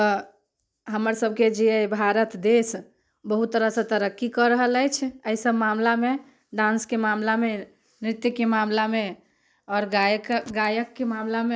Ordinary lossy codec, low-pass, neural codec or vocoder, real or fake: none; none; none; real